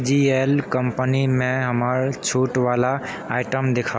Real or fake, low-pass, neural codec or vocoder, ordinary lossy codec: real; none; none; none